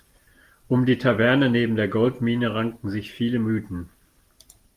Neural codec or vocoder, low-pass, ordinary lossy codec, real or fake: none; 14.4 kHz; Opus, 32 kbps; real